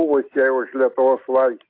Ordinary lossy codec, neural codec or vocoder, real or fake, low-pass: AAC, 32 kbps; none; real; 5.4 kHz